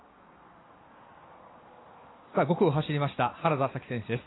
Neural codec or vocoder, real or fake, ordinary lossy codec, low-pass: none; real; AAC, 16 kbps; 7.2 kHz